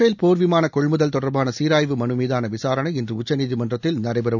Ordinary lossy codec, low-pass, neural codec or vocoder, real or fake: none; 7.2 kHz; none; real